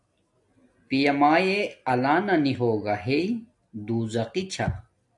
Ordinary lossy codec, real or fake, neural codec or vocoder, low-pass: AAC, 64 kbps; real; none; 10.8 kHz